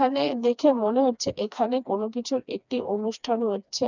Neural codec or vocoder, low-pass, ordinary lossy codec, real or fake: codec, 16 kHz, 2 kbps, FreqCodec, smaller model; 7.2 kHz; none; fake